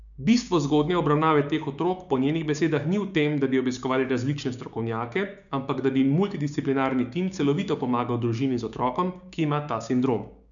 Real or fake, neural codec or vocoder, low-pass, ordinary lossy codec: fake; codec, 16 kHz, 6 kbps, DAC; 7.2 kHz; none